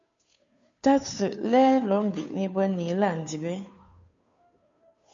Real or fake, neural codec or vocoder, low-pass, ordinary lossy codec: fake; codec, 16 kHz, 2 kbps, FunCodec, trained on Chinese and English, 25 frames a second; 7.2 kHz; AAC, 64 kbps